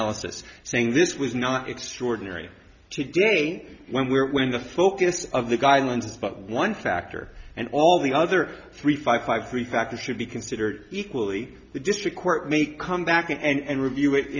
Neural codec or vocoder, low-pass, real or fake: none; 7.2 kHz; real